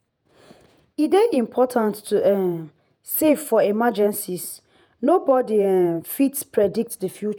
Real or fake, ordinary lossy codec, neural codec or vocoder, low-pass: fake; none; vocoder, 48 kHz, 128 mel bands, Vocos; none